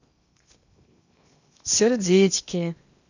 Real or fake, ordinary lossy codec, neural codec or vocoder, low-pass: fake; none; codec, 16 kHz in and 24 kHz out, 0.8 kbps, FocalCodec, streaming, 65536 codes; 7.2 kHz